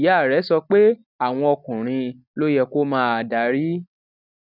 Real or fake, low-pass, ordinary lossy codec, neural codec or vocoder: real; 5.4 kHz; none; none